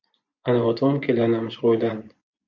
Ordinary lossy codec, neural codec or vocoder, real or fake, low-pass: MP3, 64 kbps; vocoder, 24 kHz, 100 mel bands, Vocos; fake; 7.2 kHz